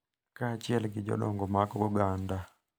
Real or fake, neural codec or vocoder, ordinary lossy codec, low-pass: real; none; none; none